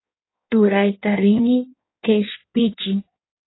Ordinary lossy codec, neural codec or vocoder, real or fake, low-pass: AAC, 16 kbps; codec, 16 kHz in and 24 kHz out, 1.1 kbps, FireRedTTS-2 codec; fake; 7.2 kHz